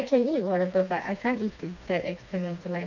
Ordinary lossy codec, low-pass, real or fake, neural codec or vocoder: none; 7.2 kHz; fake; codec, 16 kHz, 2 kbps, FreqCodec, smaller model